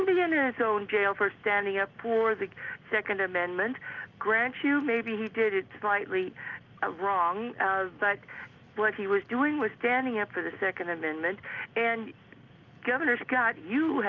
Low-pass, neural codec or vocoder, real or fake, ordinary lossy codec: 7.2 kHz; none; real; Opus, 24 kbps